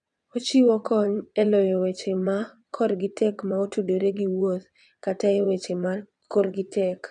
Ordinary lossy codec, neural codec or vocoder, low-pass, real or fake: none; vocoder, 22.05 kHz, 80 mel bands, WaveNeXt; 9.9 kHz; fake